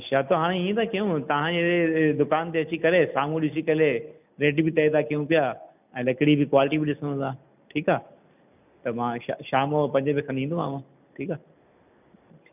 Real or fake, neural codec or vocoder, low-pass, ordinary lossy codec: real; none; 3.6 kHz; none